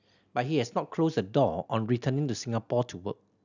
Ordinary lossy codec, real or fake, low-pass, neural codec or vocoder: none; real; 7.2 kHz; none